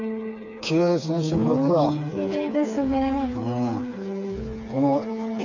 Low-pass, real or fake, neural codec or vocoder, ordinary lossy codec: 7.2 kHz; fake; codec, 16 kHz, 4 kbps, FreqCodec, smaller model; none